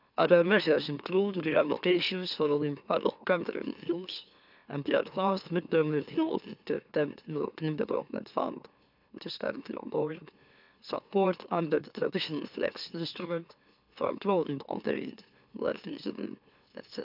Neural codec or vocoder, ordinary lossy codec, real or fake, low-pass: autoencoder, 44.1 kHz, a latent of 192 numbers a frame, MeloTTS; none; fake; 5.4 kHz